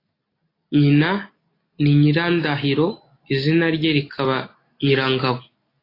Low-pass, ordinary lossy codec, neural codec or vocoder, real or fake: 5.4 kHz; AAC, 24 kbps; none; real